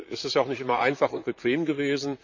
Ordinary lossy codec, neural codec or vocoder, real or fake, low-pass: none; vocoder, 44.1 kHz, 128 mel bands, Pupu-Vocoder; fake; 7.2 kHz